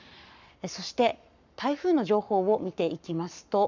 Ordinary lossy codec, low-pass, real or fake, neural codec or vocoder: none; 7.2 kHz; fake; codec, 44.1 kHz, 7.8 kbps, Pupu-Codec